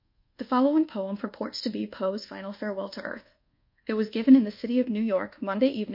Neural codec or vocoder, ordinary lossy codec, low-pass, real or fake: codec, 24 kHz, 1.2 kbps, DualCodec; MP3, 32 kbps; 5.4 kHz; fake